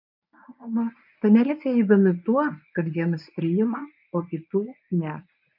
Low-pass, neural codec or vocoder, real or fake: 5.4 kHz; codec, 24 kHz, 0.9 kbps, WavTokenizer, medium speech release version 1; fake